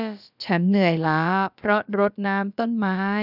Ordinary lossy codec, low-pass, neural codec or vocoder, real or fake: none; 5.4 kHz; codec, 16 kHz, about 1 kbps, DyCAST, with the encoder's durations; fake